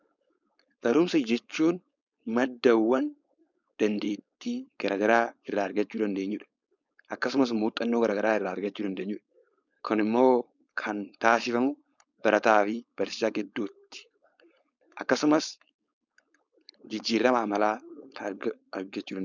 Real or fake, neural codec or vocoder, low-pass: fake; codec, 16 kHz, 4.8 kbps, FACodec; 7.2 kHz